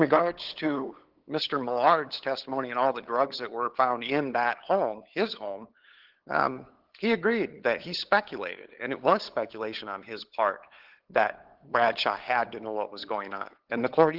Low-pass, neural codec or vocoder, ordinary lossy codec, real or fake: 5.4 kHz; codec, 16 kHz, 8 kbps, FunCodec, trained on LibriTTS, 25 frames a second; Opus, 16 kbps; fake